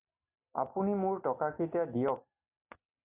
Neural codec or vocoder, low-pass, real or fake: none; 3.6 kHz; real